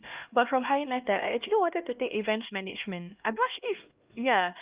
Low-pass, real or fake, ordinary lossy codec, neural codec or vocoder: 3.6 kHz; fake; Opus, 32 kbps; codec, 16 kHz, 1 kbps, X-Codec, HuBERT features, trained on LibriSpeech